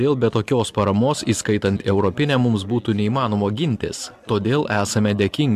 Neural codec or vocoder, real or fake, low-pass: none; real; 14.4 kHz